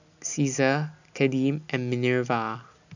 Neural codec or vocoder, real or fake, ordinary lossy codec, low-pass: none; real; none; 7.2 kHz